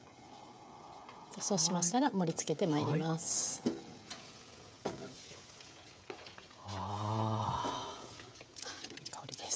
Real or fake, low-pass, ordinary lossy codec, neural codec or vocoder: fake; none; none; codec, 16 kHz, 16 kbps, FreqCodec, smaller model